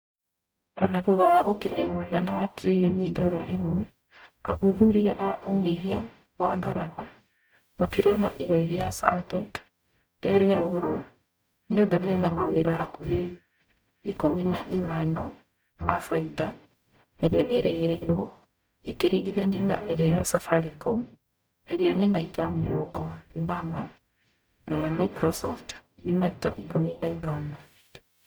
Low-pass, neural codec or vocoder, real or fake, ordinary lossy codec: none; codec, 44.1 kHz, 0.9 kbps, DAC; fake; none